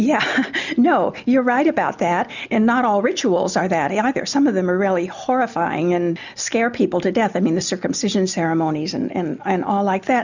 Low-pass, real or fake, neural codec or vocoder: 7.2 kHz; real; none